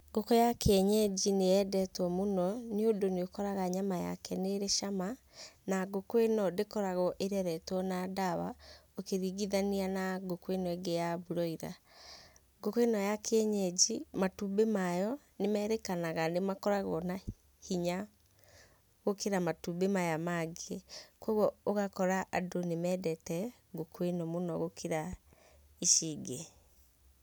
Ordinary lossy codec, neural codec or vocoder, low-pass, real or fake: none; none; none; real